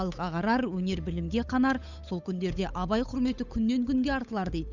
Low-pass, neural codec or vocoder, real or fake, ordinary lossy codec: 7.2 kHz; codec, 16 kHz, 8 kbps, FunCodec, trained on Chinese and English, 25 frames a second; fake; none